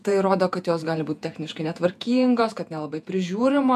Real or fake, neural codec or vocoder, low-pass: fake; vocoder, 48 kHz, 128 mel bands, Vocos; 14.4 kHz